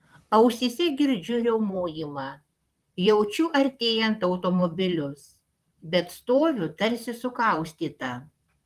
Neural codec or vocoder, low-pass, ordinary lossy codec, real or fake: vocoder, 44.1 kHz, 128 mel bands, Pupu-Vocoder; 14.4 kHz; Opus, 32 kbps; fake